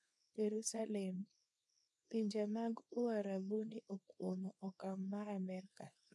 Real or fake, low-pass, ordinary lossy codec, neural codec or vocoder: fake; none; none; codec, 24 kHz, 0.9 kbps, WavTokenizer, small release